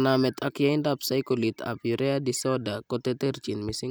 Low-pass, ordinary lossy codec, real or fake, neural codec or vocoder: none; none; real; none